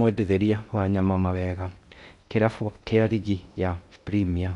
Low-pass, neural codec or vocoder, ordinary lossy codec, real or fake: 10.8 kHz; codec, 16 kHz in and 24 kHz out, 0.6 kbps, FocalCodec, streaming, 2048 codes; none; fake